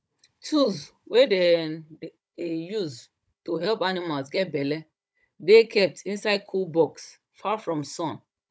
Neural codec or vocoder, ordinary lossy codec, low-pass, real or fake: codec, 16 kHz, 16 kbps, FunCodec, trained on Chinese and English, 50 frames a second; none; none; fake